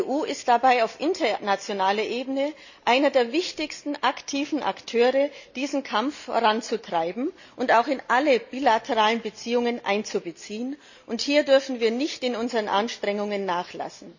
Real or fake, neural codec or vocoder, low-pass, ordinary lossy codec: real; none; 7.2 kHz; none